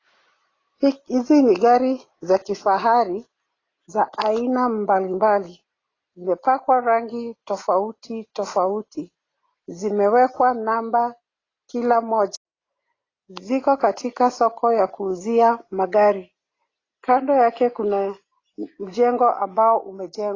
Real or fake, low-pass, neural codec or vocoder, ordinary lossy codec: real; 7.2 kHz; none; AAC, 32 kbps